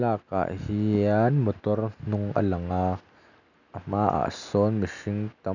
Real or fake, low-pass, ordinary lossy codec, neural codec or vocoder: real; 7.2 kHz; none; none